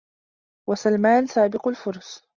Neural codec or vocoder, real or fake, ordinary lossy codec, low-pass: none; real; Opus, 64 kbps; 7.2 kHz